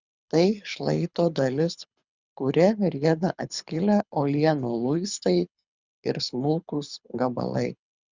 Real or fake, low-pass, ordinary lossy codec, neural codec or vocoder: fake; 7.2 kHz; Opus, 64 kbps; codec, 24 kHz, 6 kbps, HILCodec